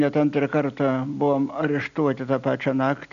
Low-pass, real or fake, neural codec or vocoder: 7.2 kHz; real; none